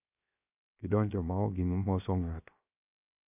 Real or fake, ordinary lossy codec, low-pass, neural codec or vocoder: fake; none; 3.6 kHz; codec, 16 kHz, 0.7 kbps, FocalCodec